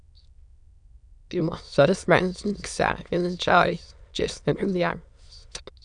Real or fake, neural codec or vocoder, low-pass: fake; autoencoder, 22.05 kHz, a latent of 192 numbers a frame, VITS, trained on many speakers; 9.9 kHz